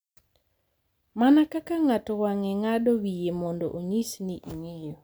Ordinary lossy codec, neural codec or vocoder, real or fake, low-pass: none; none; real; none